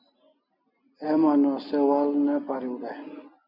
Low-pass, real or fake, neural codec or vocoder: 5.4 kHz; real; none